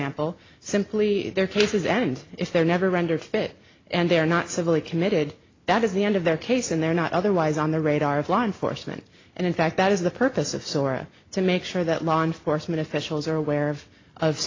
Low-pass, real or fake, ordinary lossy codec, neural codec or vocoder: 7.2 kHz; real; AAC, 32 kbps; none